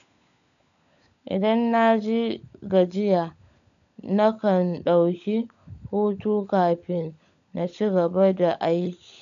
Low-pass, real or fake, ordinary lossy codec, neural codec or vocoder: 7.2 kHz; fake; none; codec, 16 kHz, 16 kbps, FunCodec, trained on LibriTTS, 50 frames a second